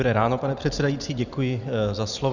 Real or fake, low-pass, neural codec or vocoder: real; 7.2 kHz; none